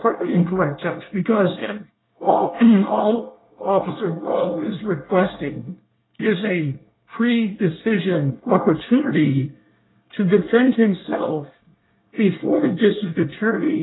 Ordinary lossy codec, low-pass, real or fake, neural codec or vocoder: AAC, 16 kbps; 7.2 kHz; fake; codec, 24 kHz, 1 kbps, SNAC